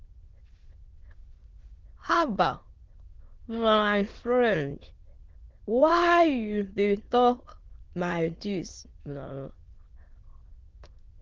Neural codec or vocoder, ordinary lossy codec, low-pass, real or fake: autoencoder, 22.05 kHz, a latent of 192 numbers a frame, VITS, trained on many speakers; Opus, 16 kbps; 7.2 kHz; fake